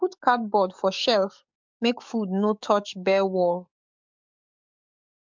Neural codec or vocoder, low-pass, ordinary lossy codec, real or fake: codec, 44.1 kHz, 7.8 kbps, DAC; 7.2 kHz; MP3, 64 kbps; fake